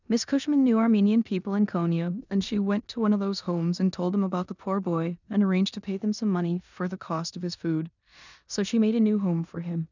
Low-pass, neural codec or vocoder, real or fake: 7.2 kHz; codec, 16 kHz in and 24 kHz out, 0.9 kbps, LongCat-Audio-Codec, four codebook decoder; fake